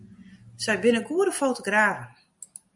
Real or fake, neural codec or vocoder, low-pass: real; none; 10.8 kHz